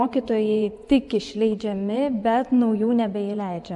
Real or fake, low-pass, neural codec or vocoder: fake; 10.8 kHz; vocoder, 24 kHz, 100 mel bands, Vocos